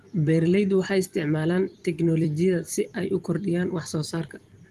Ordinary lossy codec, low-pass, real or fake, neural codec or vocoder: Opus, 24 kbps; 14.4 kHz; fake; vocoder, 44.1 kHz, 128 mel bands every 256 samples, BigVGAN v2